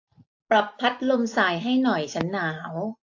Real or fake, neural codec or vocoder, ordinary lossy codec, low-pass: real; none; AAC, 48 kbps; 7.2 kHz